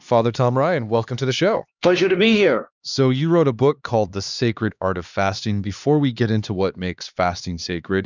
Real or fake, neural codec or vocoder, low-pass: fake; codec, 16 kHz, 2 kbps, X-Codec, HuBERT features, trained on LibriSpeech; 7.2 kHz